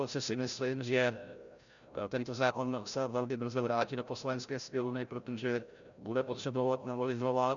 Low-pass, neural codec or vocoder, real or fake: 7.2 kHz; codec, 16 kHz, 0.5 kbps, FreqCodec, larger model; fake